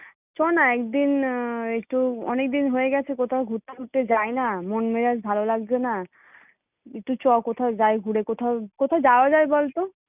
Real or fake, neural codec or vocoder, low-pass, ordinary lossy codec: real; none; 3.6 kHz; none